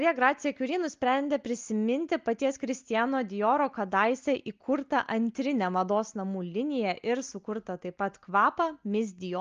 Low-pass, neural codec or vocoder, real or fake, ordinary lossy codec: 7.2 kHz; none; real; Opus, 32 kbps